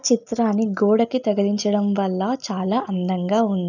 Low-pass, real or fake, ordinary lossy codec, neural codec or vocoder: 7.2 kHz; real; none; none